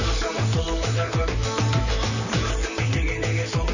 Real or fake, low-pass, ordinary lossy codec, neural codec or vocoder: fake; 7.2 kHz; none; vocoder, 44.1 kHz, 128 mel bands, Pupu-Vocoder